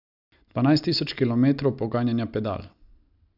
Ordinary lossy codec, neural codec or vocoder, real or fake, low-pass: none; none; real; 5.4 kHz